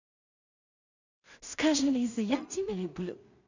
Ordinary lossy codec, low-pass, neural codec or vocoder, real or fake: none; 7.2 kHz; codec, 16 kHz in and 24 kHz out, 0.4 kbps, LongCat-Audio-Codec, two codebook decoder; fake